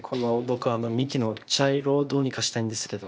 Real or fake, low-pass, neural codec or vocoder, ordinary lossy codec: fake; none; codec, 16 kHz, 0.8 kbps, ZipCodec; none